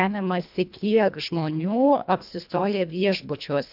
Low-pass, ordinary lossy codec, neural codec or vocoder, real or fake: 5.4 kHz; AAC, 48 kbps; codec, 24 kHz, 1.5 kbps, HILCodec; fake